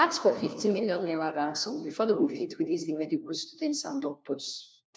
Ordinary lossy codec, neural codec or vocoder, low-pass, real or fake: none; codec, 16 kHz, 1 kbps, FunCodec, trained on LibriTTS, 50 frames a second; none; fake